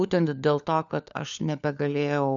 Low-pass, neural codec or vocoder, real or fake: 7.2 kHz; codec, 16 kHz, 4 kbps, FunCodec, trained on LibriTTS, 50 frames a second; fake